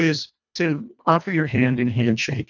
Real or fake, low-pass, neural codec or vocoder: fake; 7.2 kHz; codec, 24 kHz, 1.5 kbps, HILCodec